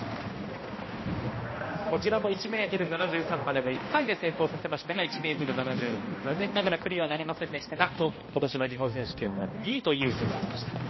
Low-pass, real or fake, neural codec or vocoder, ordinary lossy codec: 7.2 kHz; fake; codec, 16 kHz, 1 kbps, X-Codec, HuBERT features, trained on balanced general audio; MP3, 24 kbps